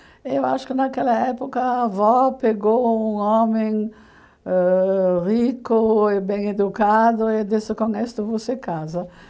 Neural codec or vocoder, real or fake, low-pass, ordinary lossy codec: none; real; none; none